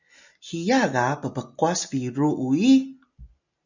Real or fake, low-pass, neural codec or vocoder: real; 7.2 kHz; none